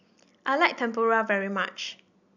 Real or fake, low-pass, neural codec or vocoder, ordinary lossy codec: real; 7.2 kHz; none; none